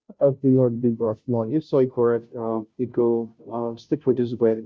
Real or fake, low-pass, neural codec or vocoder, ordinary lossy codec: fake; none; codec, 16 kHz, 0.5 kbps, FunCodec, trained on Chinese and English, 25 frames a second; none